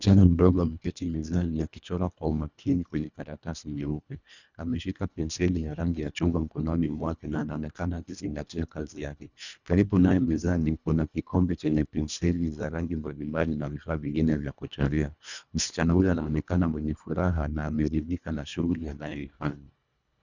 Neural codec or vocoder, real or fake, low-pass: codec, 24 kHz, 1.5 kbps, HILCodec; fake; 7.2 kHz